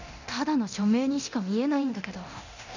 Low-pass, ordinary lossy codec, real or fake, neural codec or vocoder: 7.2 kHz; none; fake; codec, 24 kHz, 0.9 kbps, DualCodec